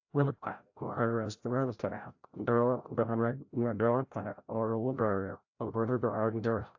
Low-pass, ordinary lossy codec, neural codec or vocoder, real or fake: 7.2 kHz; AAC, 48 kbps; codec, 16 kHz, 0.5 kbps, FreqCodec, larger model; fake